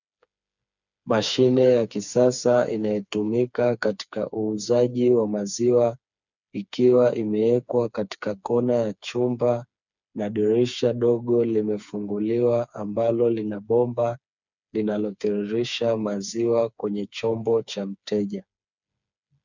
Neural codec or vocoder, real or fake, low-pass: codec, 16 kHz, 4 kbps, FreqCodec, smaller model; fake; 7.2 kHz